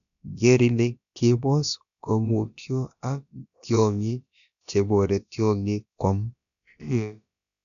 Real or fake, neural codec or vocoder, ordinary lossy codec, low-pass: fake; codec, 16 kHz, about 1 kbps, DyCAST, with the encoder's durations; none; 7.2 kHz